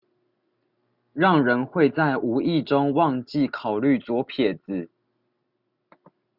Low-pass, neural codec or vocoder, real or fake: 5.4 kHz; none; real